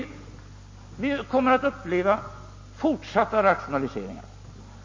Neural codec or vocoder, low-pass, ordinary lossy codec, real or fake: none; 7.2 kHz; MP3, 32 kbps; real